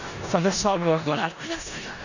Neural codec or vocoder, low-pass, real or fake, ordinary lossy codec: codec, 16 kHz in and 24 kHz out, 0.4 kbps, LongCat-Audio-Codec, four codebook decoder; 7.2 kHz; fake; none